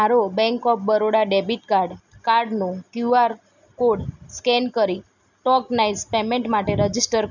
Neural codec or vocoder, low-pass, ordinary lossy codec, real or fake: none; 7.2 kHz; none; real